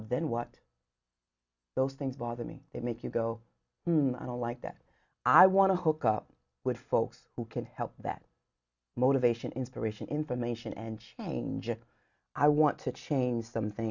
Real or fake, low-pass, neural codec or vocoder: real; 7.2 kHz; none